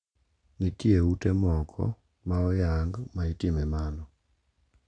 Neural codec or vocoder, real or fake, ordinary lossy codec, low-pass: none; real; none; 9.9 kHz